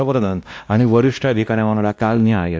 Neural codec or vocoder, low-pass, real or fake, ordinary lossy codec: codec, 16 kHz, 1 kbps, X-Codec, WavLM features, trained on Multilingual LibriSpeech; none; fake; none